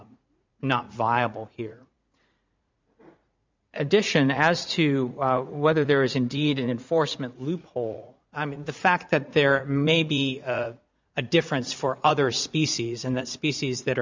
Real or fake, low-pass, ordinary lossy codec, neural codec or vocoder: fake; 7.2 kHz; MP3, 64 kbps; vocoder, 44.1 kHz, 80 mel bands, Vocos